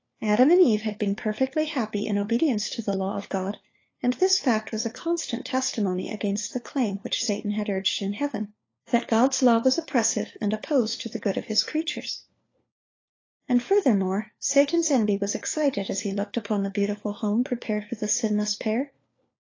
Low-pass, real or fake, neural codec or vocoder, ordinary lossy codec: 7.2 kHz; fake; codec, 16 kHz, 4 kbps, FunCodec, trained on LibriTTS, 50 frames a second; AAC, 32 kbps